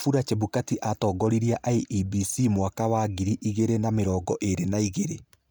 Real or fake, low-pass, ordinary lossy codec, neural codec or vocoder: real; none; none; none